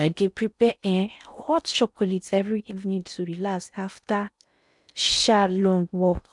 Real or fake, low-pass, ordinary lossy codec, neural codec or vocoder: fake; 10.8 kHz; none; codec, 16 kHz in and 24 kHz out, 0.6 kbps, FocalCodec, streaming, 4096 codes